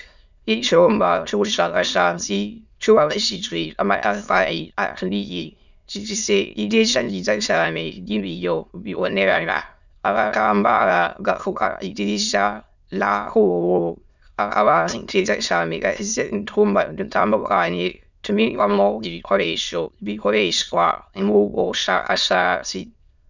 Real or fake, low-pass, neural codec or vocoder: fake; 7.2 kHz; autoencoder, 22.05 kHz, a latent of 192 numbers a frame, VITS, trained on many speakers